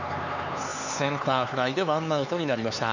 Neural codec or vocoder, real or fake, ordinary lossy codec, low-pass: codec, 16 kHz, 4 kbps, X-Codec, HuBERT features, trained on LibriSpeech; fake; none; 7.2 kHz